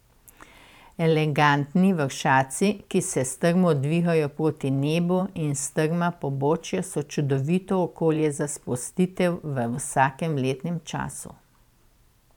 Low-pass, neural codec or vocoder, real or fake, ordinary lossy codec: 19.8 kHz; vocoder, 44.1 kHz, 128 mel bands every 512 samples, BigVGAN v2; fake; none